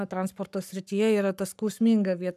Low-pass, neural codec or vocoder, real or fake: 14.4 kHz; codec, 44.1 kHz, 7.8 kbps, Pupu-Codec; fake